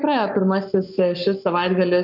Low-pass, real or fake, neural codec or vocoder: 5.4 kHz; fake; codec, 44.1 kHz, 7.8 kbps, Pupu-Codec